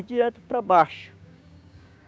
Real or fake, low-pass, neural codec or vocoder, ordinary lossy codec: fake; none; codec, 16 kHz, 6 kbps, DAC; none